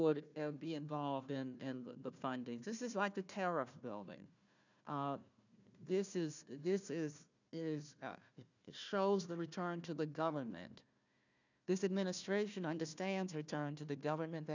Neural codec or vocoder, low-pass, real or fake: codec, 16 kHz, 1 kbps, FunCodec, trained on Chinese and English, 50 frames a second; 7.2 kHz; fake